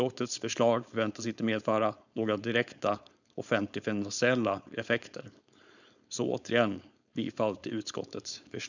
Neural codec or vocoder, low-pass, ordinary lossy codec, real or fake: codec, 16 kHz, 4.8 kbps, FACodec; 7.2 kHz; none; fake